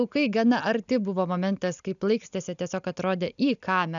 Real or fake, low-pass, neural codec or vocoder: real; 7.2 kHz; none